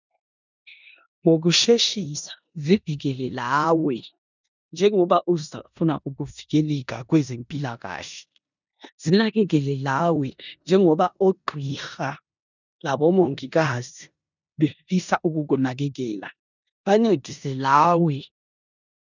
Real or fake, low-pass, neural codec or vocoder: fake; 7.2 kHz; codec, 16 kHz in and 24 kHz out, 0.9 kbps, LongCat-Audio-Codec, four codebook decoder